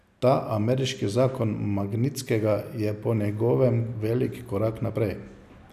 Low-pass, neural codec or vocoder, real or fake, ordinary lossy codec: 14.4 kHz; none; real; none